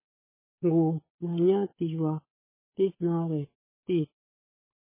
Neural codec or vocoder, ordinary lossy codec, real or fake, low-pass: codec, 16 kHz, 8 kbps, FunCodec, trained on Chinese and English, 25 frames a second; MP3, 16 kbps; fake; 3.6 kHz